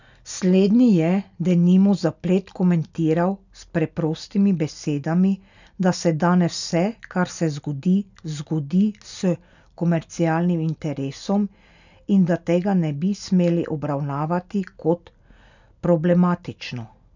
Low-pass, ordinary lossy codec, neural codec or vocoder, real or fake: 7.2 kHz; none; none; real